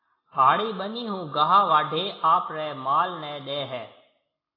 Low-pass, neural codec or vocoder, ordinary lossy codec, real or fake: 5.4 kHz; none; AAC, 24 kbps; real